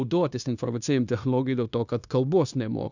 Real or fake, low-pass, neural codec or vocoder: fake; 7.2 kHz; codec, 16 kHz, 0.9 kbps, LongCat-Audio-Codec